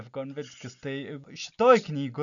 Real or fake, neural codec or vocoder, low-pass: real; none; 7.2 kHz